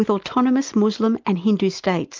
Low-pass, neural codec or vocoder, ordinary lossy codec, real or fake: 7.2 kHz; none; Opus, 32 kbps; real